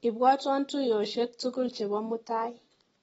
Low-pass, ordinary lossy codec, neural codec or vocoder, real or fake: 19.8 kHz; AAC, 24 kbps; none; real